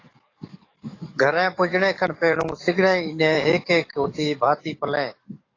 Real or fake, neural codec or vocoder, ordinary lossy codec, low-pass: fake; codec, 44.1 kHz, 7.8 kbps, DAC; AAC, 32 kbps; 7.2 kHz